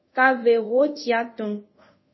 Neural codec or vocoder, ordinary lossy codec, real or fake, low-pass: codec, 24 kHz, 0.5 kbps, DualCodec; MP3, 24 kbps; fake; 7.2 kHz